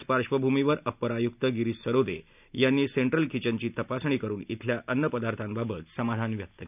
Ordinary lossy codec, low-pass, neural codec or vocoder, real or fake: none; 3.6 kHz; none; real